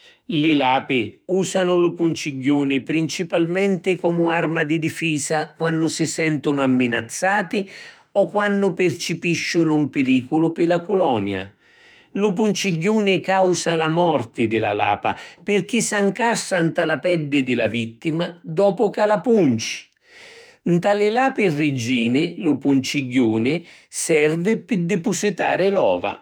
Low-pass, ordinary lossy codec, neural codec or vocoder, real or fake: none; none; autoencoder, 48 kHz, 32 numbers a frame, DAC-VAE, trained on Japanese speech; fake